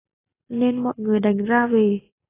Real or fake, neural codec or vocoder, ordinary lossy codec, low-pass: real; none; AAC, 16 kbps; 3.6 kHz